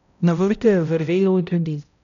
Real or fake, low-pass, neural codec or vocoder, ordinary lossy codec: fake; 7.2 kHz; codec, 16 kHz, 0.5 kbps, X-Codec, HuBERT features, trained on balanced general audio; none